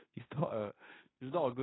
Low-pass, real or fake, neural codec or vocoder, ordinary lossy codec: 7.2 kHz; fake; codec, 16 kHz in and 24 kHz out, 0.9 kbps, LongCat-Audio-Codec, fine tuned four codebook decoder; AAC, 16 kbps